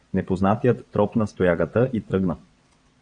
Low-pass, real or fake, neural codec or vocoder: 9.9 kHz; fake; vocoder, 22.05 kHz, 80 mel bands, WaveNeXt